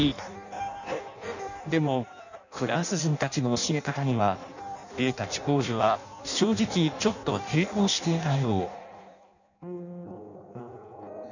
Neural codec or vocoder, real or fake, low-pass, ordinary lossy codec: codec, 16 kHz in and 24 kHz out, 0.6 kbps, FireRedTTS-2 codec; fake; 7.2 kHz; none